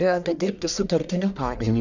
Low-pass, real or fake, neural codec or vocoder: 7.2 kHz; fake; codec, 44.1 kHz, 1.7 kbps, Pupu-Codec